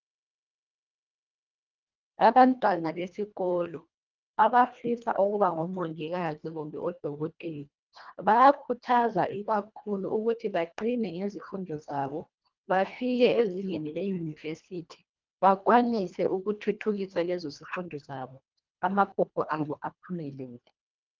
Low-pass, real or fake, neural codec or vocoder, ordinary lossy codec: 7.2 kHz; fake; codec, 24 kHz, 1.5 kbps, HILCodec; Opus, 24 kbps